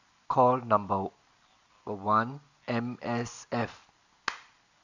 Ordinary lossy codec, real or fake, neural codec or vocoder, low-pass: none; real; none; 7.2 kHz